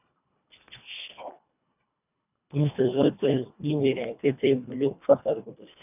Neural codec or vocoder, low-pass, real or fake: codec, 24 kHz, 1.5 kbps, HILCodec; 3.6 kHz; fake